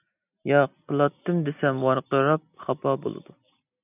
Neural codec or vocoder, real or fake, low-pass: none; real; 3.6 kHz